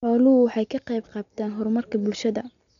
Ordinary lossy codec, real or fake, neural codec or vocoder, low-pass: none; real; none; 7.2 kHz